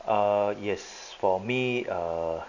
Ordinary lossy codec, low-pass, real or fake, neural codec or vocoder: none; 7.2 kHz; real; none